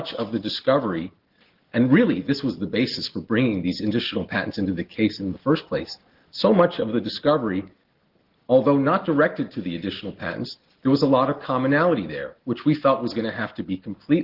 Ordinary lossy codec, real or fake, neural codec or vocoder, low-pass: Opus, 16 kbps; real; none; 5.4 kHz